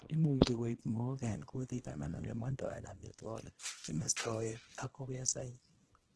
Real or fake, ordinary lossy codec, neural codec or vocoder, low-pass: fake; Opus, 16 kbps; codec, 24 kHz, 0.9 kbps, WavTokenizer, small release; 10.8 kHz